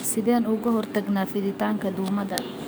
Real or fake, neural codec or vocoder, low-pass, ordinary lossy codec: fake; codec, 44.1 kHz, 7.8 kbps, DAC; none; none